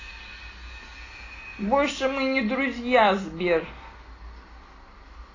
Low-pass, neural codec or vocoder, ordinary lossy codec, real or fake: 7.2 kHz; none; AAC, 32 kbps; real